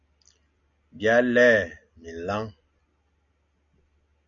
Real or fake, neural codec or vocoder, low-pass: real; none; 7.2 kHz